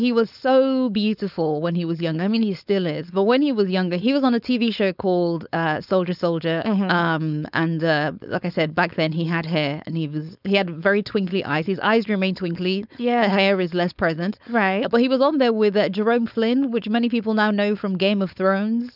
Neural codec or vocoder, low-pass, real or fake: codec, 16 kHz, 4.8 kbps, FACodec; 5.4 kHz; fake